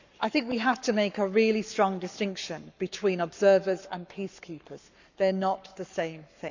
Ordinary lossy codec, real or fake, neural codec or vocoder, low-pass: none; fake; codec, 44.1 kHz, 7.8 kbps, Pupu-Codec; 7.2 kHz